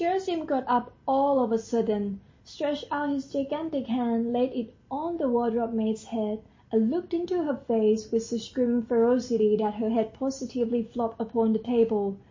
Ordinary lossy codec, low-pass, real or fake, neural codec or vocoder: MP3, 32 kbps; 7.2 kHz; real; none